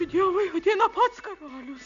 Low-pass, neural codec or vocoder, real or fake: 7.2 kHz; none; real